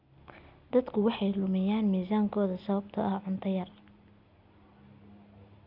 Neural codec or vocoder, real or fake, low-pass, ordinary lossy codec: none; real; 5.4 kHz; none